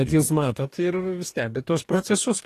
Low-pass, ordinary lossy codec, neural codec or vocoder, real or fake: 14.4 kHz; AAC, 48 kbps; codec, 44.1 kHz, 2.6 kbps, DAC; fake